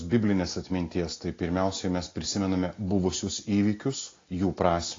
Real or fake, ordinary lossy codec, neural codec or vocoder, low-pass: real; AAC, 32 kbps; none; 7.2 kHz